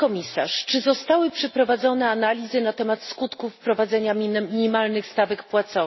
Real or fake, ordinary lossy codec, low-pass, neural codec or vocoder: real; MP3, 24 kbps; 7.2 kHz; none